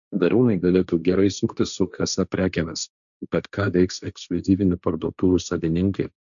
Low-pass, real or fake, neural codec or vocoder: 7.2 kHz; fake; codec, 16 kHz, 1.1 kbps, Voila-Tokenizer